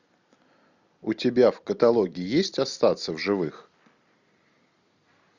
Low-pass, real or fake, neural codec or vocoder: 7.2 kHz; real; none